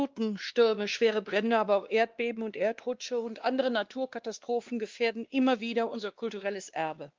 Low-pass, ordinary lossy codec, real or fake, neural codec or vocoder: 7.2 kHz; Opus, 32 kbps; fake; codec, 16 kHz, 2 kbps, X-Codec, WavLM features, trained on Multilingual LibriSpeech